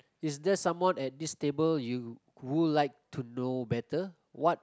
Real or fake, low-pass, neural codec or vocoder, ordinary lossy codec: real; none; none; none